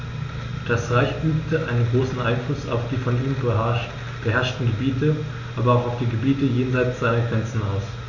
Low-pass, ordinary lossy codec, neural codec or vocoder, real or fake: 7.2 kHz; none; none; real